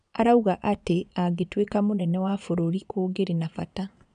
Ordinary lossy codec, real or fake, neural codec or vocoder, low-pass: none; fake; vocoder, 22.05 kHz, 80 mel bands, Vocos; 9.9 kHz